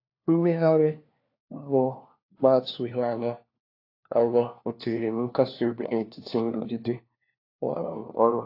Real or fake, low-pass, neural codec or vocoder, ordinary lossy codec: fake; 5.4 kHz; codec, 16 kHz, 1 kbps, FunCodec, trained on LibriTTS, 50 frames a second; AAC, 32 kbps